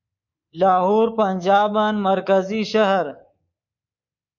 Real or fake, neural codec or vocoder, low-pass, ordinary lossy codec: fake; codec, 24 kHz, 3.1 kbps, DualCodec; 7.2 kHz; MP3, 64 kbps